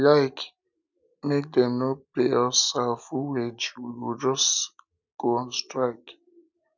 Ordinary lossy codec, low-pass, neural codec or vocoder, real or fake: none; 7.2 kHz; none; real